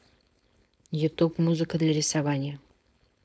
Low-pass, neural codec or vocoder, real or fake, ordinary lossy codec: none; codec, 16 kHz, 4.8 kbps, FACodec; fake; none